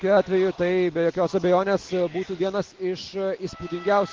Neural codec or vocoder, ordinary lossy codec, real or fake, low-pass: none; Opus, 16 kbps; real; 7.2 kHz